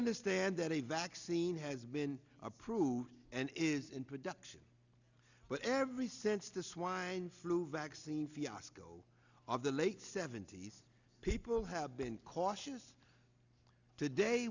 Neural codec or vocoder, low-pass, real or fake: none; 7.2 kHz; real